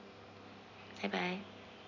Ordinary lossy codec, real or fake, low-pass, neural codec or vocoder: none; real; 7.2 kHz; none